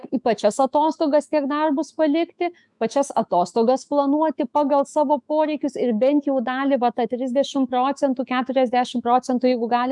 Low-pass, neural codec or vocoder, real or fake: 10.8 kHz; autoencoder, 48 kHz, 128 numbers a frame, DAC-VAE, trained on Japanese speech; fake